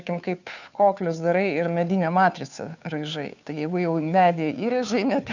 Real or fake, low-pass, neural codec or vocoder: fake; 7.2 kHz; codec, 16 kHz, 2 kbps, FunCodec, trained on Chinese and English, 25 frames a second